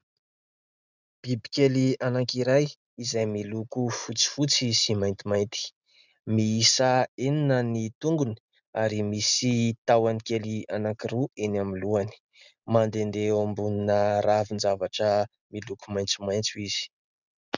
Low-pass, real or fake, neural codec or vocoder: 7.2 kHz; real; none